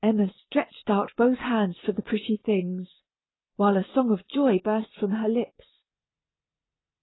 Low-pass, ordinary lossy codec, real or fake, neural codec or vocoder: 7.2 kHz; AAC, 16 kbps; real; none